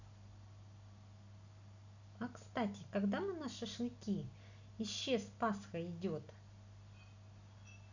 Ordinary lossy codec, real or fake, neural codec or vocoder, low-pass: none; real; none; 7.2 kHz